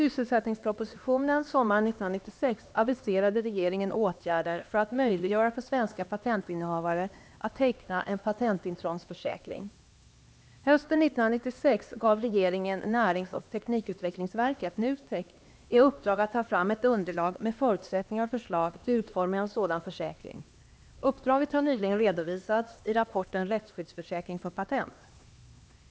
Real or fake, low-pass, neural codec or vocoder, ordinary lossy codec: fake; none; codec, 16 kHz, 2 kbps, X-Codec, HuBERT features, trained on LibriSpeech; none